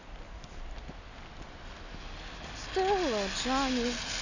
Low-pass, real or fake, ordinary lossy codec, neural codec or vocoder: 7.2 kHz; real; none; none